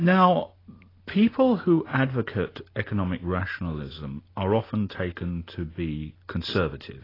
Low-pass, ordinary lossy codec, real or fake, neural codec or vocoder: 5.4 kHz; AAC, 24 kbps; real; none